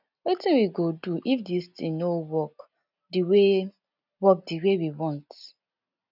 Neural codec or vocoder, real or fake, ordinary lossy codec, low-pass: none; real; none; 5.4 kHz